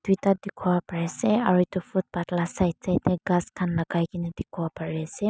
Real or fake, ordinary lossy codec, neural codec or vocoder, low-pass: real; none; none; none